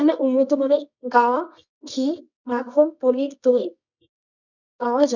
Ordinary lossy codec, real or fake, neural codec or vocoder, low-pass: none; fake; codec, 24 kHz, 0.9 kbps, WavTokenizer, medium music audio release; 7.2 kHz